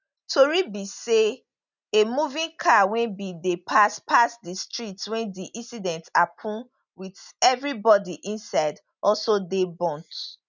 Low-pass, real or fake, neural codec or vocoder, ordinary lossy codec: 7.2 kHz; real; none; none